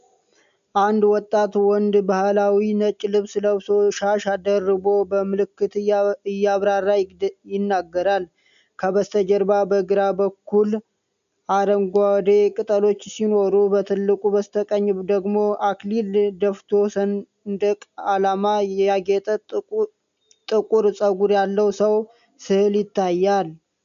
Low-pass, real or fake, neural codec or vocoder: 7.2 kHz; real; none